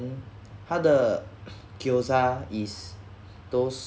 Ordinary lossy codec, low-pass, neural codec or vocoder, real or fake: none; none; none; real